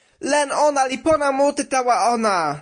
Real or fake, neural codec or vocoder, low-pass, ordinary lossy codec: real; none; 9.9 kHz; MP3, 48 kbps